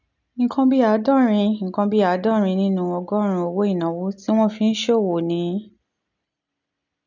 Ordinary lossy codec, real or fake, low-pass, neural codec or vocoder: none; real; 7.2 kHz; none